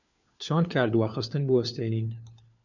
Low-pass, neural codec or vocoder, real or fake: 7.2 kHz; codec, 16 kHz, 4 kbps, FunCodec, trained on LibriTTS, 50 frames a second; fake